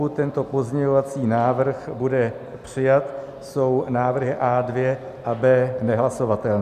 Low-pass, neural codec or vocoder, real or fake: 14.4 kHz; none; real